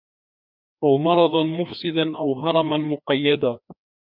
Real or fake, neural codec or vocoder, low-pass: fake; codec, 16 kHz, 2 kbps, FreqCodec, larger model; 5.4 kHz